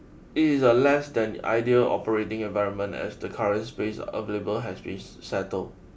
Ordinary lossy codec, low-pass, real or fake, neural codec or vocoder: none; none; real; none